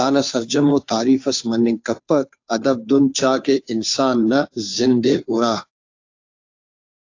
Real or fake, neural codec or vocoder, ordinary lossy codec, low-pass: fake; codec, 16 kHz, 2 kbps, FunCodec, trained on Chinese and English, 25 frames a second; AAC, 48 kbps; 7.2 kHz